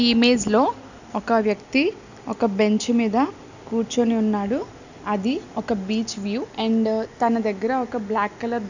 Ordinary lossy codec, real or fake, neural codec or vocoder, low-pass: none; real; none; 7.2 kHz